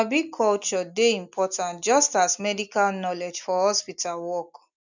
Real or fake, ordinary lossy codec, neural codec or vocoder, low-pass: real; none; none; 7.2 kHz